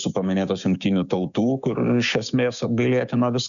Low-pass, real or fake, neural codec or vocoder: 7.2 kHz; fake; codec, 16 kHz, 6 kbps, DAC